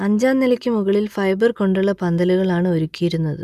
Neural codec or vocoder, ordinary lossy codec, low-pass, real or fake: none; none; 14.4 kHz; real